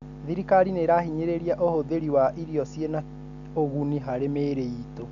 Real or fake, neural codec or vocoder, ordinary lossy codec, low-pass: real; none; none; 7.2 kHz